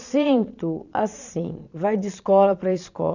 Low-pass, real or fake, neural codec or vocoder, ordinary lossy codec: 7.2 kHz; fake; vocoder, 22.05 kHz, 80 mel bands, Vocos; none